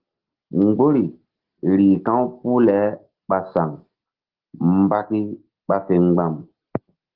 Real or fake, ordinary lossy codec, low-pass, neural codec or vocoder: real; Opus, 16 kbps; 5.4 kHz; none